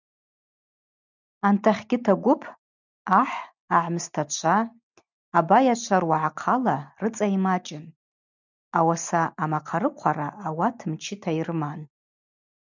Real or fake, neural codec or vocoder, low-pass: real; none; 7.2 kHz